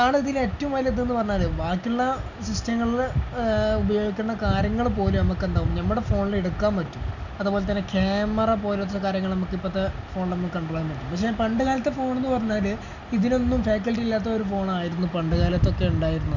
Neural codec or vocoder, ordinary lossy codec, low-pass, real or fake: none; none; 7.2 kHz; real